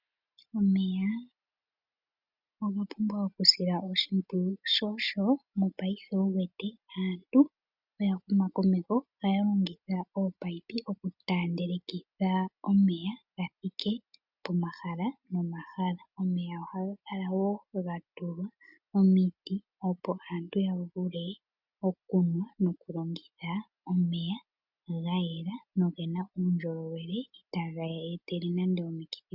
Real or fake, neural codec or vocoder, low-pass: real; none; 5.4 kHz